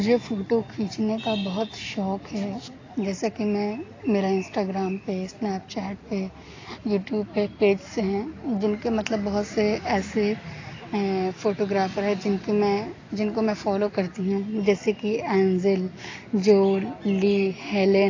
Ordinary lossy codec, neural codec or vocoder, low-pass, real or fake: AAC, 32 kbps; none; 7.2 kHz; real